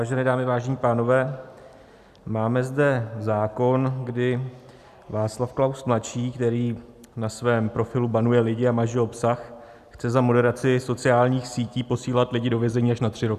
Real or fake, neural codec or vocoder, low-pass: real; none; 14.4 kHz